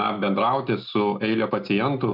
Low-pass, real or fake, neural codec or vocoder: 5.4 kHz; real; none